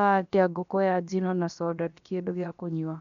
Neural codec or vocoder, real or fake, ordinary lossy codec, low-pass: codec, 16 kHz, about 1 kbps, DyCAST, with the encoder's durations; fake; none; 7.2 kHz